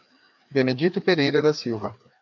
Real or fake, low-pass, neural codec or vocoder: fake; 7.2 kHz; codec, 16 kHz, 2 kbps, FreqCodec, larger model